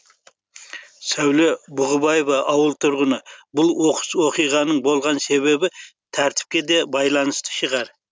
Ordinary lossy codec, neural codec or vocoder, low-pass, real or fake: none; none; none; real